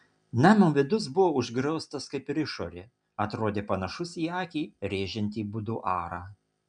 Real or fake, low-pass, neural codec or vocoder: real; 9.9 kHz; none